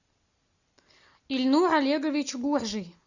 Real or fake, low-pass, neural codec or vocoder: real; 7.2 kHz; none